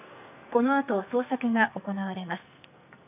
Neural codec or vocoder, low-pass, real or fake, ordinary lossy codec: codec, 44.1 kHz, 2.6 kbps, SNAC; 3.6 kHz; fake; none